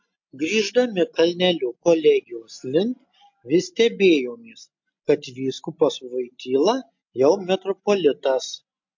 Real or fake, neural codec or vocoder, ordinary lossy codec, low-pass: real; none; MP3, 48 kbps; 7.2 kHz